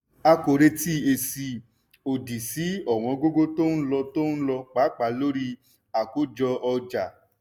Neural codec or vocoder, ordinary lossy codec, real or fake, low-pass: none; none; real; none